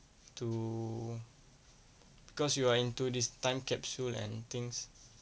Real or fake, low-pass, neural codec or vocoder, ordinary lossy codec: real; none; none; none